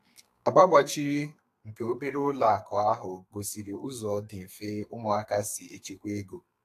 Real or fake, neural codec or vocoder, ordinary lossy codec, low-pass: fake; codec, 32 kHz, 1.9 kbps, SNAC; AAC, 64 kbps; 14.4 kHz